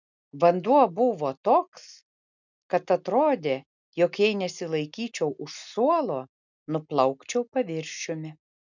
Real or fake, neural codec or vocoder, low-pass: real; none; 7.2 kHz